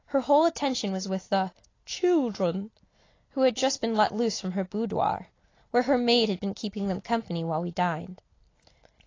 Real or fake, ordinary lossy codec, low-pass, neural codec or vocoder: real; AAC, 32 kbps; 7.2 kHz; none